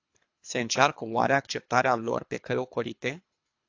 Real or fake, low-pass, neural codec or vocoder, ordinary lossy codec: fake; 7.2 kHz; codec, 24 kHz, 3 kbps, HILCodec; AAC, 48 kbps